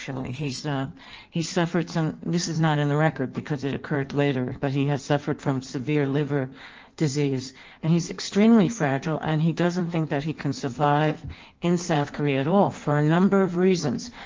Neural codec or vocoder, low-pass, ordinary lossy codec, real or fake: codec, 16 kHz in and 24 kHz out, 1.1 kbps, FireRedTTS-2 codec; 7.2 kHz; Opus, 24 kbps; fake